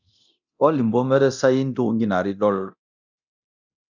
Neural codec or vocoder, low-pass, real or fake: codec, 24 kHz, 0.9 kbps, DualCodec; 7.2 kHz; fake